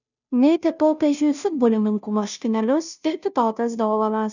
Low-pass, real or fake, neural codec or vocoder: 7.2 kHz; fake; codec, 16 kHz, 0.5 kbps, FunCodec, trained on Chinese and English, 25 frames a second